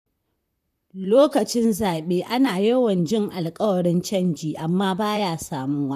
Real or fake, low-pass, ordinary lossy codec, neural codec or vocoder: fake; 14.4 kHz; none; vocoder, 44.1 kHz, 128 mel bands, Pupu-Vocoder